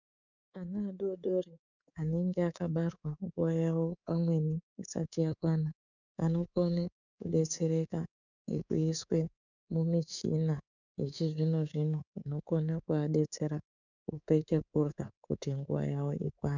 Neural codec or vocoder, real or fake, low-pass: codec, 24 kHz, 3.1 kbps, DualCodec; fake; 7.2 kHz